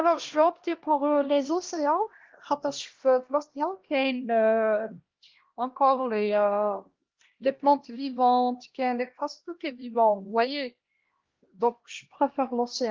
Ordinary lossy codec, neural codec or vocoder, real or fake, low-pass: Opus, 16 kbps; codec, 16 kHz, 1 kbps, X-Codec, HuBERT features, trained on LibriSpeech; fake; 7.2 kHz